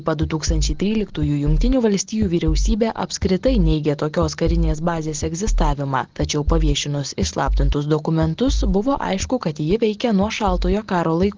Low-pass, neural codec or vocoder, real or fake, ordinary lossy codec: 7.2 kHz; none; real; Opus, 16 kbps